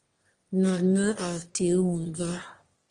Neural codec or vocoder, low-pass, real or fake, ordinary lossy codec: autoencoder, 22.05 kHz, a latent of 192 numbers a frame, VITS, trained on one speaker; 9.9 kHz; fake; Opus, 24 kbps